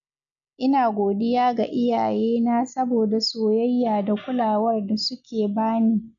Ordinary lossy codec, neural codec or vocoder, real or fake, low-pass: none; none; real; 7.2 kHz